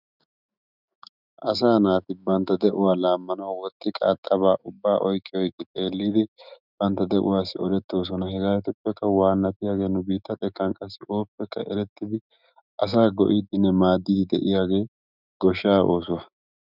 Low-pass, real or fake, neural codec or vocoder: 5.4 kHz; fake; autoencoder, 48 kHz, 128 numbers a frame, DAC-VAE, trained on Japanese speech